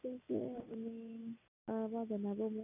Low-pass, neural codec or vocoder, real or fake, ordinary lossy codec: 3.6 kHz; none; real; none